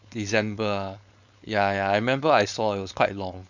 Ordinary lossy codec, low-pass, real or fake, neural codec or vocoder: none; 7.2 kHz; fake; codec, 16 kHz, 4.8 kbps, FACodec